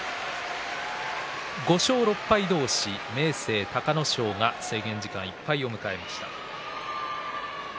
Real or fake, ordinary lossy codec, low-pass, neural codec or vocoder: real; none; none; none